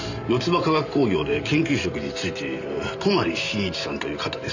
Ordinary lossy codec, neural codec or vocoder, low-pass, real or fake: none; vocoder, 44.1 kHz, 128 mel bands every 512 samples, BigVGAN v2; 7.2 kHz; fake